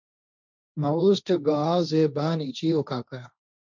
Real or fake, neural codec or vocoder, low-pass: fake; codec, 16 kHz, 1.1 kbps, Voila-Tokenizer; 7.2 kHz